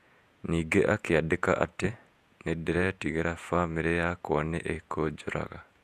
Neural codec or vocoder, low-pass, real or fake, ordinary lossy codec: vocoder, 48 kHz, 128 mel bands, Vocos; 14.4 kHz; fake; Opus, 64 kbps